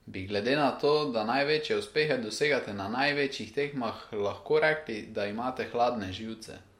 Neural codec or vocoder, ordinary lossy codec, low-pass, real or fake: none; MP3, 64 kbps; 19.8 kHz; real